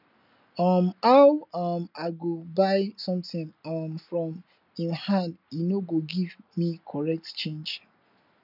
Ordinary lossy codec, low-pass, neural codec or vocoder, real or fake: none; 5.4 kHz; none; real